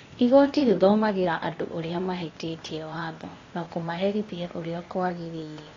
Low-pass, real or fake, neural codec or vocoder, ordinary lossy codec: 7.2 kHz; fake; codec, 16 kHz, 0.8 kbps, ZipCodec; AAC, 32 kbps